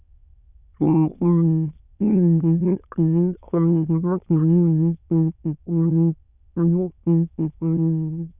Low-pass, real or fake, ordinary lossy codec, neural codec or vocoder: 3.6 kHz; fake; none; autoencoder, 22.05 kHz, a latent of 192 numbers a frame, VITS, trained on many speakers